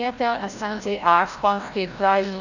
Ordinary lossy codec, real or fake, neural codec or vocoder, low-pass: none; fake; codec, 16 kHz, 0.5 kbps, FreqCodec, larger model; 7.2 kHz